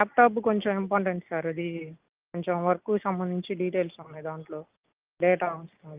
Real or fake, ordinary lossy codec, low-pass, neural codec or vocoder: real; Opus, 24 kbps; 3.6 kHz; none